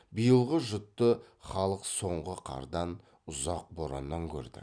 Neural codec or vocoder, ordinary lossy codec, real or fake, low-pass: none; none; real; 9.9 kHz